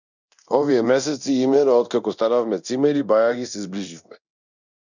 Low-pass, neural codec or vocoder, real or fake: 7.2 kHz; codec, 24 kHz, 0.9 kbps, DualCodec; fake